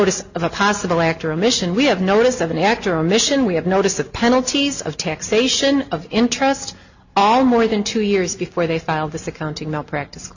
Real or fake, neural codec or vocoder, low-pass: real; none; 7.2 kHz